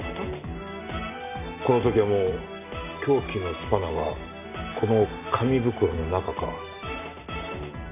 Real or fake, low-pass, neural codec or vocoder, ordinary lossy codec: real; 3.6 kHz; none; none